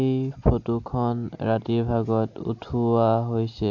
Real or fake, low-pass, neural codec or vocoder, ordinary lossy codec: real; 7.2 kHz; none; AAC, 48 kbps